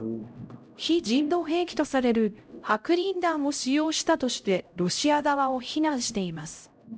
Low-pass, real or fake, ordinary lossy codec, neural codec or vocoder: none; fake; none; codec, 16 kHz, 0.5 kbps, X-Codec, HuBERT features, trained on LibriSpeech